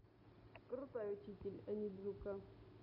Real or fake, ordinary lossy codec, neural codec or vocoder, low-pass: real; none; none; 5.4 kHz